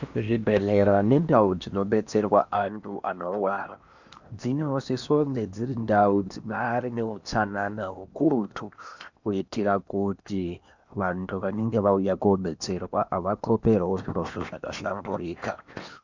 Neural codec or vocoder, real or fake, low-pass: codec, 16 kHz in and 24 kHz out, 0.8 kbps, FocalCodec, streaming, 65536 codes; fake; 7.2 kHz